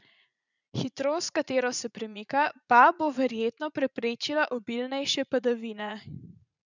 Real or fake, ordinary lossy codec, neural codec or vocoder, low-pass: fake; none; vocoder, 44.1 kHz, 80 mel bands, Vocos; 7.2 kHz